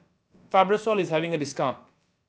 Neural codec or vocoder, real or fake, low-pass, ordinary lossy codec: codec, 16 kHz, about 1 kbps, DyCAST, with the encoder's durations; fake; none; none